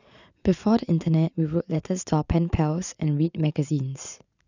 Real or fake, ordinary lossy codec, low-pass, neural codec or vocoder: fake; none; 7.2 kHz; vocoder, 44.1 kHz, 80 mel bands, Vocos